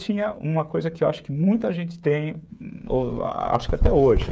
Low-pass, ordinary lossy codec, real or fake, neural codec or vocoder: none; none; fake; codec, 16 kHz, 8 kbps, FreqCodec, smaller model